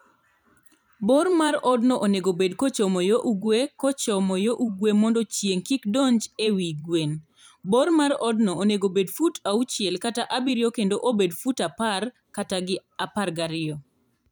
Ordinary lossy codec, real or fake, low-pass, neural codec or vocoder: none; fake; none; vocoder, 44.1 kHz, 128 mel bands every 512 samples, BigVGAN v2